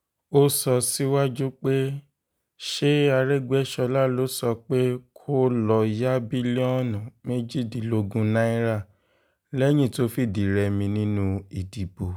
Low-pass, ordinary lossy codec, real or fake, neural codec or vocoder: none; none; real; none